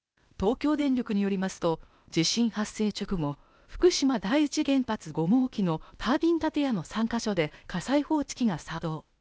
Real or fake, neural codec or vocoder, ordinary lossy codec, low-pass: fake; codec, 16 kHz, 0.8 kbps, ZipCodec; none; none